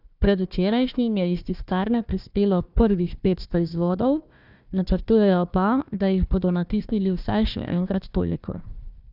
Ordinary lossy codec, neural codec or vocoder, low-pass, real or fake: none; codec, 16 kHz, 1 kbps, FunCodec, trained on Chinese and English, 50 frames a second; 5.4 kHz; fake